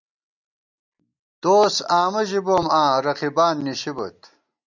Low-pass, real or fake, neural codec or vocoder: 7.2 kHz; real; none